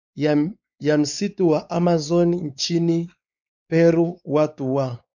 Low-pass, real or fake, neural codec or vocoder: 7.2 kHz; fake; codec, 16 kHz, 4 kbps, X-Codec, WavLM features, trained on Multilingual LibriSpeech